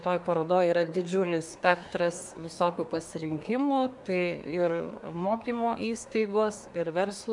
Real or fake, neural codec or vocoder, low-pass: fake; codec, 24 kHz, 1 kbps, SNAC; 10.8 kHz